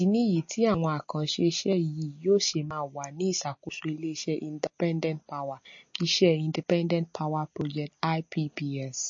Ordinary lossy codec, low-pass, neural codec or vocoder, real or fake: MP3, 32 kbps; 7.2 kHz; none; real